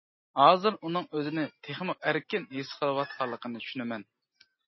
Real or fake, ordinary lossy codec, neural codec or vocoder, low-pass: real; MP3, 24 kbps; none; 7.2 kHz